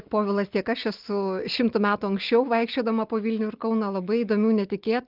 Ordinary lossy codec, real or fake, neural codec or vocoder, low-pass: Opus, 32 kbps; real; none; 5.4 kHz